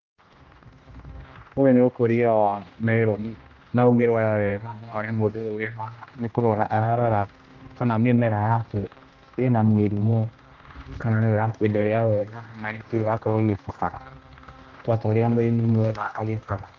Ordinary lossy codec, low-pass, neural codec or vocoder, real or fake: Opus, 32 kbps; 7.2 kHz; codec, 16 kHz, 1 kbps, X-Codec, HuBERT features, trained on general audio; fake